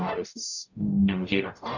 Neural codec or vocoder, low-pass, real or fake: codec, 44.1 kHz, 0.9 kbps, DAC; 7.2 kHz; fake